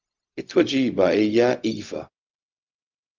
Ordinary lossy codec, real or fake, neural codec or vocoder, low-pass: Opus, 24 kbps; fake; codec, 16 kHz, 0.4 kbps, LongCat-Audio-Codec; 7.2 kHz